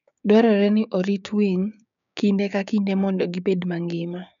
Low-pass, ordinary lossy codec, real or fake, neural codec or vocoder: 7.2 kHz; none; fake; codec, 16 kHz, 6 kbps, DAC